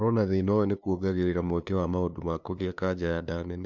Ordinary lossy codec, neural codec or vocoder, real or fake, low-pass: MP3, 64 kbps; codec, 16 kHz, 2 kbps, FunCodec, trained on LibriTTS, 25 frames a second; fake; 7.2 kHz